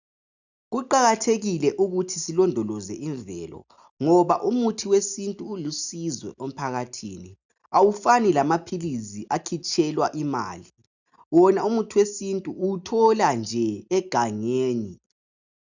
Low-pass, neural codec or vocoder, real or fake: 7.2 kHz; none; real